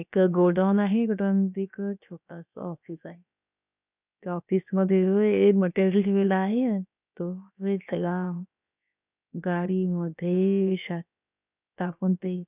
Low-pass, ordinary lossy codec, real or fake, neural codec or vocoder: 3.6 kHz; none; fake; codec, 16 kHz, about 1 kbps, DyCAST, with the encoder's durations